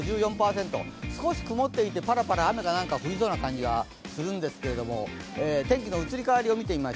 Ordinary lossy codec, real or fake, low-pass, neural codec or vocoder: none; real; none; none